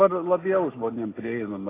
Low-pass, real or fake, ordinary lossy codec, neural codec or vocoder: 3.6 kHz; real; AAC, 16 kbps; none